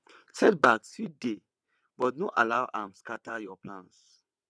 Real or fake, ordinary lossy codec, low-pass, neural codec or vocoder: fake; none; none; vocoder, 22.05 kHz, 80 mel bands, WaveNeXt